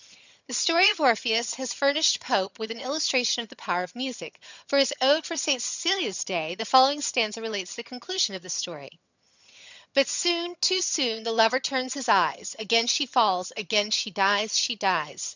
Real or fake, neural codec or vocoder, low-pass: fake; vocoder, 22.05 kHz, 80 mel bands, HiFi-GAN; 7.2 kHz